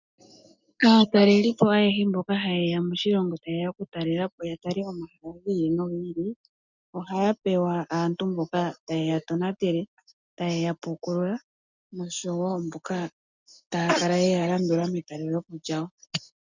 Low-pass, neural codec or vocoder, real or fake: 7.2 kHz; none; real